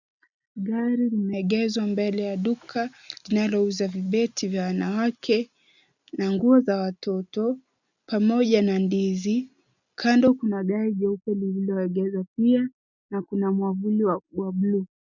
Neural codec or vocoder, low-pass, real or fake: none; 7.2 kHz; real